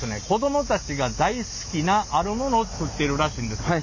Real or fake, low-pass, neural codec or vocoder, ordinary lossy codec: real; 7.2 kHz; none; none